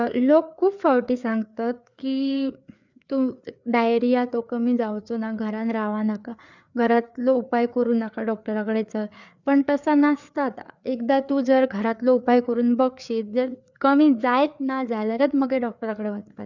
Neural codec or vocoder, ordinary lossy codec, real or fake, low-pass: codec, 16 kHz, 4 kbps, FreqCodec, larger model; none; fake; 7.2 kHz